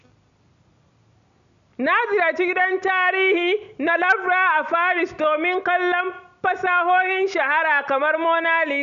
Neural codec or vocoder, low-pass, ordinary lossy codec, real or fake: none; 7.2 kHz; MP3, 96 kbps; real